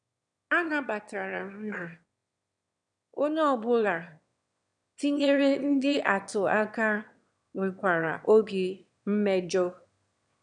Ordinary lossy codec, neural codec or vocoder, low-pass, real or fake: none; autoencoder, 22.05 kHz, a latent of 192 numbers a frame, VITS, trained on one speaker; 9.9 kHz; fake